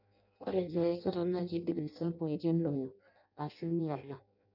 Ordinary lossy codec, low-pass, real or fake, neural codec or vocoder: none; 5.4 kHz; fake; codec, 16 kHz in and 24 kHz out, 0.6 kbps, FireRedTTS-2 codec